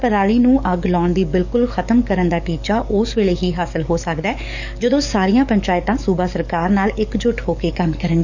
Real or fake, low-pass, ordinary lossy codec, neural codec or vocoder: fake; 7.2 kHz; none; codec, 44.1 kHz, 7.8 kbps, DAC